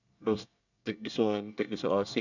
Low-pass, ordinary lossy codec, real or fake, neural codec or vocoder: 7.2 kHz; none; fake; codec, 24 kHz, 1 kbps, SNAC